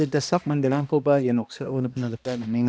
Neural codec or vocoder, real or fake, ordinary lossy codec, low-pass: codec, 16 kHz, 1 kbps, X-Codec, HuBERT features, trained on balanced general audio; fake; none; none